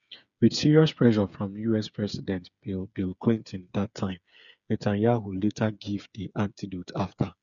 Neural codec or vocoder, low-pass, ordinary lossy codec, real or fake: codec, 16 kHz, 8 kbps, FreqCodec, smaller model; 7.2 kHz; none; fake